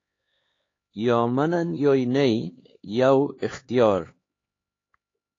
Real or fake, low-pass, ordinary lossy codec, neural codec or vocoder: fake; 7.2 kHz; AAC, 32 kbps; codec, 16 kHz, 4 kbps, X-Codec, HuBERT features, trained on LibriSpeech